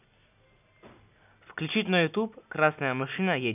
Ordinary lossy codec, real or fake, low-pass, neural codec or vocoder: none; real; 3.6 kHz; none